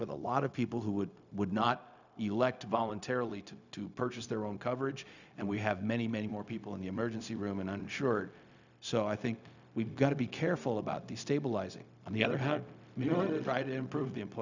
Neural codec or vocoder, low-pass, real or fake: codec, 16 kHz, 0.4 kbps, LongCat-Audio-Codec; 7.2 kHz; fake